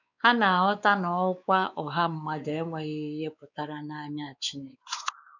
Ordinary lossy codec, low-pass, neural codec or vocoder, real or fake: none; 7.2 kHz; codec, 16 kHz, 4 kbps, X-Codec, WavLM features, trained on Multilingual LibriSpeech; fake